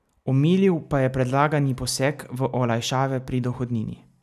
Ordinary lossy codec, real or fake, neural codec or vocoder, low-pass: none; real; none; 14.4 kHz